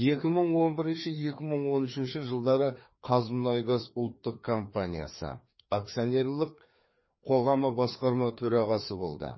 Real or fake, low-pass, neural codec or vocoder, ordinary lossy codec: fake; 7.2 kHz; codec, 16 kHz, 2 kbps, FreqCodec, larger model; MP3, 24 kbps